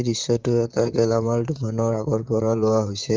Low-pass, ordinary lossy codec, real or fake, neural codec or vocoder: 7.2 kHz; Opus, 32 kbps; fake; vocoder, 44.1 kHz, 128 mel bands, Pupu-Vocoder